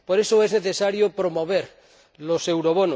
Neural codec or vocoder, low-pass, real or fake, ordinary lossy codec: none; none; real; none